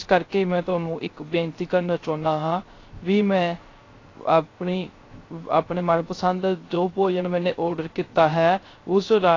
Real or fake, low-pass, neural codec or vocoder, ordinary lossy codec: fake; 7.2 kHz; codec, 16 kHz, 0.3 kbps, FocalCodec; AAC, 32 kbps